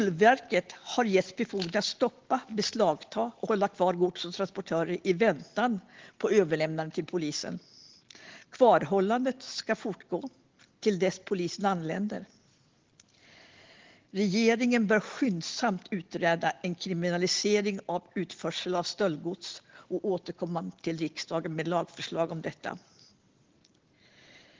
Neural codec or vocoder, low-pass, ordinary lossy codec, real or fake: none; 7.2 kHz; Opus, 16 kbps; real